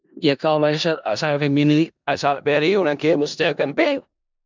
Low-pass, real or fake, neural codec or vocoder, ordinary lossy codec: 7.2 kHz; fake; codec, 16 kHz in and 24 kHz out, 0.4 kbps, LongCat-Audio-Codec, four codebook decoder; MP3, 64 kbps